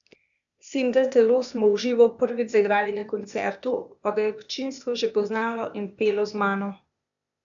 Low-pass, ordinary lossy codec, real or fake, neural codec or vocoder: 7.2 kHz; none; fake; codec, 16 kHz, 0.8 kbps, ZipCodec